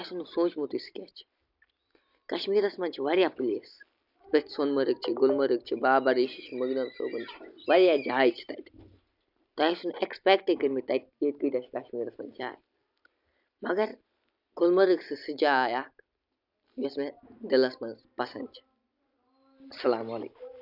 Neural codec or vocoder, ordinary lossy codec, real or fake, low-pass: none; none; real; 5.4 kHz